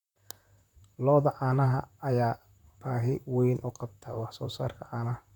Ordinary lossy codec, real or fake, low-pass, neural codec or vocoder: none; fake; 19.8 kHz; vocoder, 44.1 kHz, 128 mel bands, Pupu-Vocoder